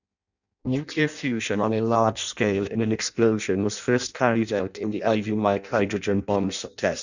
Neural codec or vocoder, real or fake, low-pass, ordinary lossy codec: codec, 16 kHz in and 24 kHz out, 0.6 kbps, FireRedTTS-2 codec; fake; 7.2 kHz; none